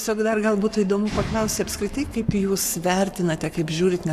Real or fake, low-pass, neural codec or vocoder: fake; 14.4 kHz; codec, 44.1 kHz, 7.8 kbps, Pupu-Codec